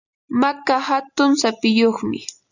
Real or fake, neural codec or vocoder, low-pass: real; none; 7.2 kHz